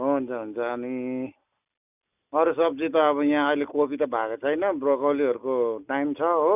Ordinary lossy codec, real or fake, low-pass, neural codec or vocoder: none; real; 3.6 kHz; none